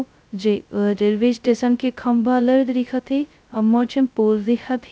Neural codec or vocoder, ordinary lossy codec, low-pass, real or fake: codec, 16 kHz, 0.2 kbps, FocalCodec; none; none; fake